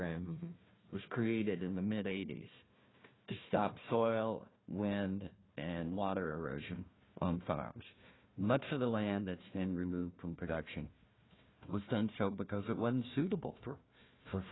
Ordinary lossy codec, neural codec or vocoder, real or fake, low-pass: AAC, 16 kbps; codec, 16 kHz, 1 kbps, FunCodec, trained on Chinese and English, 50 frames a second; fake; 7.2 kHz